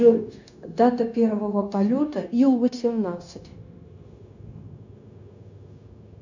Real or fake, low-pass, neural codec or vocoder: fake; 7.2 kHz; codec, 16 kHz, 0.9 kbps, LongCat-Audio-Codec